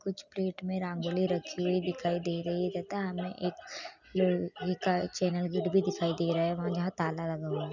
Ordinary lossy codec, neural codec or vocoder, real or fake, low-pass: none; none; real; 7.2 kHz